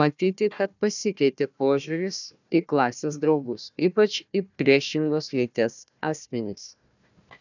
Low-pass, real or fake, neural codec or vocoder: 7.2 kHz; fake; codec, 16 kHz, 1 kbps, FunCodec, trained on Chinese and English, 50 frames a second